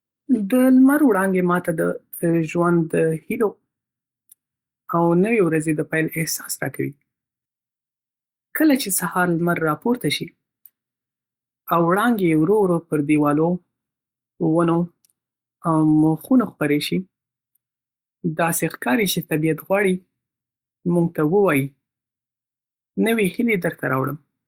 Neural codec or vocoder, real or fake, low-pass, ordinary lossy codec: none; real; 19.8 kHz; Opus, 64 kbps